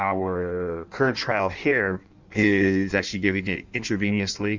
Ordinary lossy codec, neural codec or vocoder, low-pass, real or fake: Opus, 64 kbps; codec, 16 kHz in and 24 kHz out, 1.1 kbps, FireRedTTS-2 codec; 7.2 kHz; fake